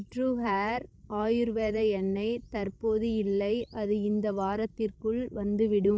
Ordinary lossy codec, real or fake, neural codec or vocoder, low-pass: none; fake; codec, 16 kHz, 16 kbps, FreqCodec, larger model; none